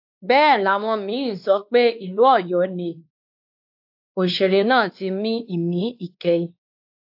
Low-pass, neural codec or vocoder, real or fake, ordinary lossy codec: 5.4 kHz; codec, 16 kHz, 2 kbps, X-Codec, WavLM features, trained on Multilingual LibriSpeech; fake; AAC, 48 kbps